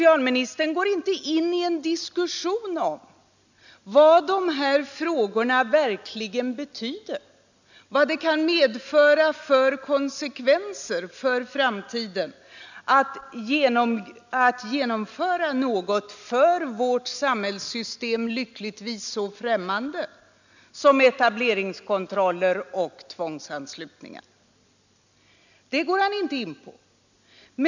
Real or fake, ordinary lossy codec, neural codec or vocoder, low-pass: real; none; none; 7.2 kHz